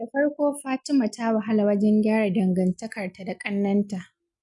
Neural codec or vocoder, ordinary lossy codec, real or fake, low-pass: none; none; real; 10.8 kHz